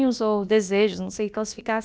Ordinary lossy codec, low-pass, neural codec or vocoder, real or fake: none; none; codec, 16 kHz, about 1 kbps, DyCAST, with the encoder's durations; fake